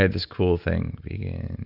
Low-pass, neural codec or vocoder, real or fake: 5.4 kHz; none; real